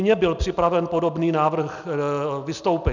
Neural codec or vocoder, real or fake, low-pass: none; real; 7.2 kHz